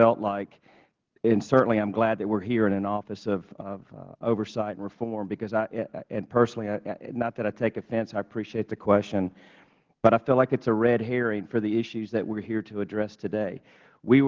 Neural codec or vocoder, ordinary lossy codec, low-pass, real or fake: none; Opus, 16 kbps; 7.2 kHz; real